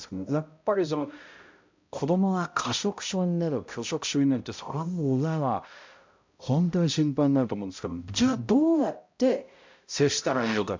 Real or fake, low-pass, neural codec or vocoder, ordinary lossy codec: fake; 7.2 kHz; codec, 16 kHz, 0.5 kbps, X-Codec, HuBERT features, trained on balanced general audio; MP3, 64 kbps